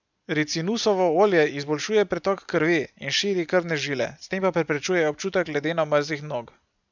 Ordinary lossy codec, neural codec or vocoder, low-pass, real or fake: none; none; 7.2 kHz; real